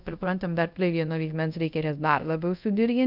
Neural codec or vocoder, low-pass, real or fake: codec, 24 kHz, 0.5 kbps, DualCodec; 5.4 kHz; fake